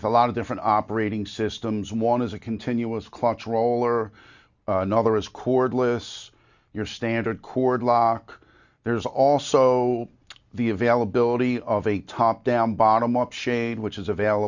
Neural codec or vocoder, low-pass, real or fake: none; 7.2 kHz; real